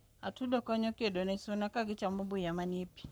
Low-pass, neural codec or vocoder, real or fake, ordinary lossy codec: none; codec, 44.1 kHz, 7.8 kbps, Pupu-Codec; fake; none